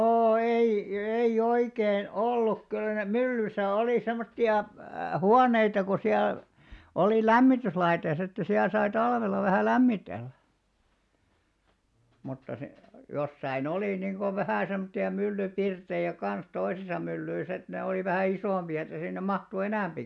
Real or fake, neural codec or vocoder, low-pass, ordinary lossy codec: real; none; none; none